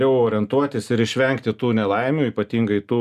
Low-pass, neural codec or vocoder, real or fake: 14.4 kHz; none; real